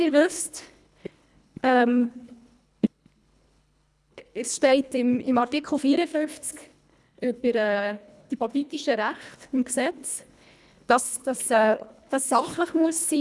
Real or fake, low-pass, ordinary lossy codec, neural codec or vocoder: fake; none; none; codec, 24 kHz, 1.5 kbps, HILCodec